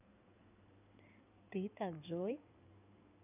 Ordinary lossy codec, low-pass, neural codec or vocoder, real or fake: none; 3.6 kHz; codec, 16 kHz in and 24 kHz out, 2.2 kbps, FireRedTTS-2 codec; fake